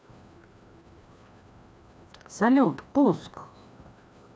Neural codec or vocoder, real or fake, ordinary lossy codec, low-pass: codec, 16 kHz, 1 kbps, FreqCodec, larger model; fake; none; none